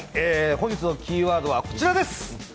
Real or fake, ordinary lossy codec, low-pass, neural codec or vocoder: real; none; none; none